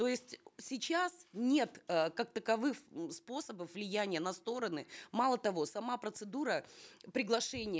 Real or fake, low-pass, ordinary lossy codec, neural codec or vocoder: real; none; none; none